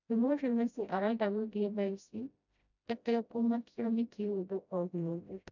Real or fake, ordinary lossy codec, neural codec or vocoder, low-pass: fake; none; codec, 16 kHz, 0.5 kbps, FreqCodec, smaller model; 7.2 kHz